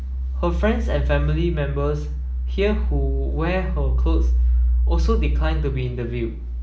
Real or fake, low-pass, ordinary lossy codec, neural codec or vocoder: real; none; none; none